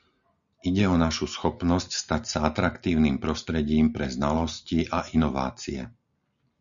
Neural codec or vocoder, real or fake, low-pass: none; real; 7.2 kHz